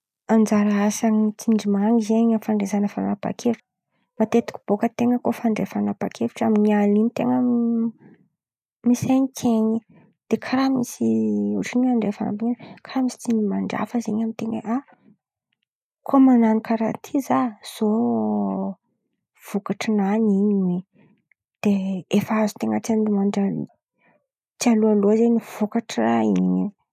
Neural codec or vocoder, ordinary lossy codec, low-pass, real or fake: none; none; 14.4 kHz; real